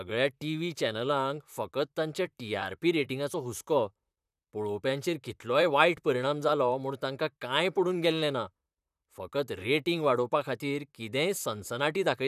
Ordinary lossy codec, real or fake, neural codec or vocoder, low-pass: none; fake; vocoder, 44.1 kHz, 128 mel bands, Pupu-Vocoder; 14.4 kHz